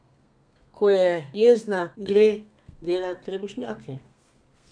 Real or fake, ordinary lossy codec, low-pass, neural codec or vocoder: fake; none; 9.9 kHz; codec, 32 kHz, 1.9 kbps, SNAC